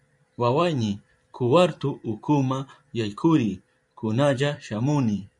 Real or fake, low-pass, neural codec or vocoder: fake; 10.8 kHz; vocoder, 44.1 kHz, 128 mel bands every 512 samples, BigVGAN v2